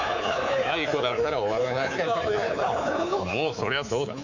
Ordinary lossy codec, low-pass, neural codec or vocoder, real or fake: none; 7.2 kHz; codec, 24 kHz, 3.1 kbps, DualCodec; fake